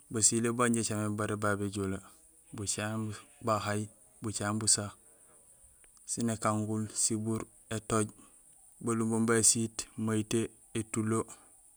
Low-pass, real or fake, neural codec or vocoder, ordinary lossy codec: none; real; none; none